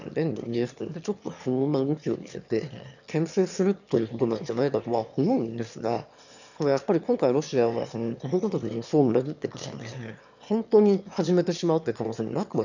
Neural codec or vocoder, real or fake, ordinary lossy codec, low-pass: autoencoder, 22.05 kHz, a latent of 192 numbers a frame, VITS, trained on one speaker; fake; none; 7.2 kHz